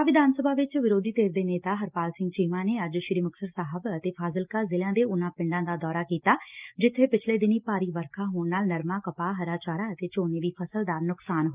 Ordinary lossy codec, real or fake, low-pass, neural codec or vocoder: Opus, 32 kbps; real; 3.6 kHz; none